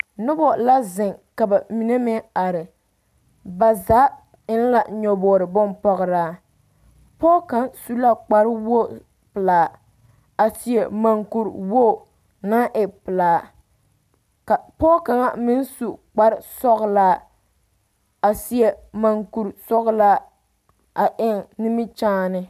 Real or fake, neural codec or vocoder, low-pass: real; none; 14.4 kHz